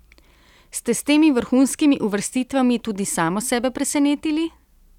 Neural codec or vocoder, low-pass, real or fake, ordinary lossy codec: none; 19.8 kHz; real; none